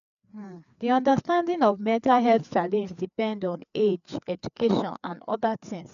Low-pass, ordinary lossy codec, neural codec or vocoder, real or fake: 7.2 kHz; none; codec, 16 kHz, 4 kbps, FreqCodec, larger model; fake